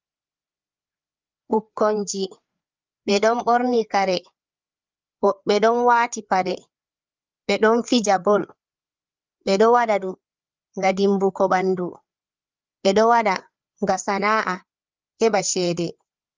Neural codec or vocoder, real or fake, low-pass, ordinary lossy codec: codec, 16 kHz, 4 kbps, FreqCodec, larger model; fake; 7.2 kHz; Opus, 24 kbps